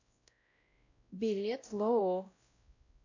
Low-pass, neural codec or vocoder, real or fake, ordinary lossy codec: 7.2 kHz; codec, 16 kHz, 0.5 kbps, X-Codec, WavLM features, trained on Multilingual LibriSpeech; fake; AAC, 48 kbps